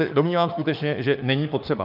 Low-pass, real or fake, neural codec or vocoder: 5.4 kHz; fake; autoencoder, 48 kHz, 32 numbers a frame, DAC-VAE, trained on Japanese speech